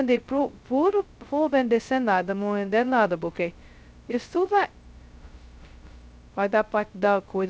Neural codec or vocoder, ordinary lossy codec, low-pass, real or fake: codec, 16 kHz, 0.2 kbps, FocalCodec; none; none; fake